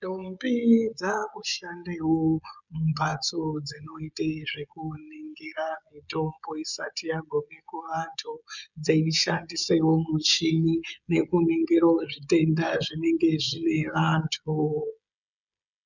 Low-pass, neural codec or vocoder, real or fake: 7.2 kHz; codec, 16 kHz, 16 kbps, FreqCodec, smaller model; fake